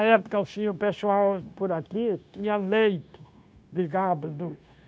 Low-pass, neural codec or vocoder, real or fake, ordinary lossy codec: none; codec, 16 kHz, 0.9 kbps, LongCat-Audio-Codec; fake; none